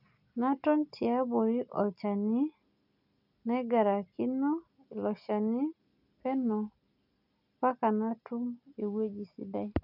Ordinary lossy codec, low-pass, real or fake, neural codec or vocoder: none; 5.4 kHz; real; none